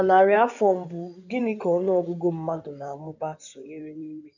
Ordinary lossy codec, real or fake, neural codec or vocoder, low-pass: none; fake; codec, 16 kHz in and 24 kHz out, 2.2 kbps, FireRedTTS-2 codec; 7.2 kHz